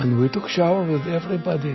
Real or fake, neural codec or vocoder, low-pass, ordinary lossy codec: real; none; 7.2 kHz; MP3, 24 kbps